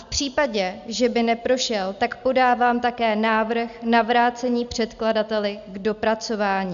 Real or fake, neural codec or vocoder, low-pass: real; none; 7.2 kHz